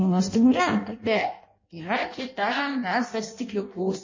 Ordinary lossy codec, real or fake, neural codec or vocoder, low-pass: MP3, 32 kbps; fake; codec, 16 kHz in and 24 kHz out, 0.6 kbps, FireRedTTS-2 codec; 7.2 kHz